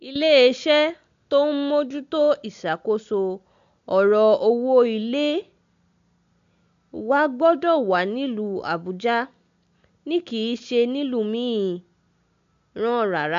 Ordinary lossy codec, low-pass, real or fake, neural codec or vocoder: AAC, 64 kbps; 7.2 kHz; real; none